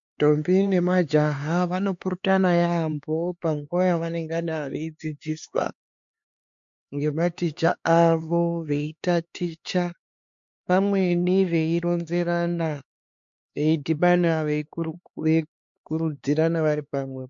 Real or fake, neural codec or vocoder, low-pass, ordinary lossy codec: fake; codec, 16 kHz, 4 kbps, X-Codec, HuBERT features, trained on LibriSpeech; 7.2 kHz; MP3, 48 kbps